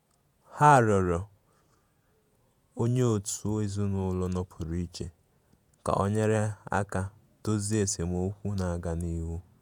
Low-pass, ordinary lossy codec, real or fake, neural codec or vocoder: 19.8 kHz; none; fake; vocoder, 48 kHz, 128 mel bands, Vocos